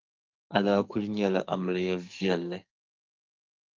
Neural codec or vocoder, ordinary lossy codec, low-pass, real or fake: codec, 44.1 kHz, 2.6 kbps, SNAC; Opus, 32 kbps; 7.2 kHz; fake